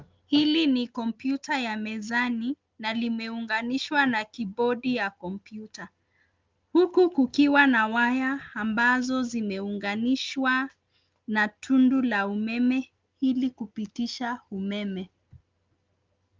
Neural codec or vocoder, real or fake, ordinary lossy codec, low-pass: none; real; Opus, 32 kbps; 7.2 kHz